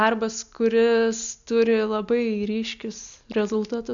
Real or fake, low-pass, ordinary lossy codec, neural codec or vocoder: real; 7.2 kHz; MP3, 96 kbps; none